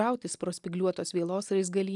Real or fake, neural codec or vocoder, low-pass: real; none; 10.8 kHz